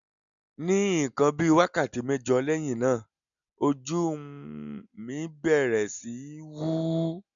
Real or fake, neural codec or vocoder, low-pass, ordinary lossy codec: real; none; 7.2 kHz; none